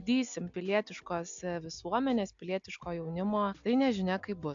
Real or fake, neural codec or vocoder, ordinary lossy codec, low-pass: real; none; AAC, 64 kbps; 7.2 kHz